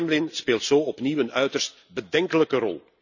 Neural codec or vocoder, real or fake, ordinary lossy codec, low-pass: none; real; none; 7.2 kHz